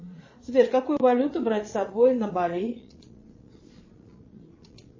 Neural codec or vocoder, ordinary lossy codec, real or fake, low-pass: vocoder, 22.05 kHz, 80 mel bands, Vocos; MP3, 32 kbps; fake; 7.2 kHz